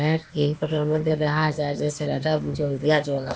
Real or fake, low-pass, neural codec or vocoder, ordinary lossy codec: fake; none; codec, 16 kHz, 0.8 kbps, ZipCodec; none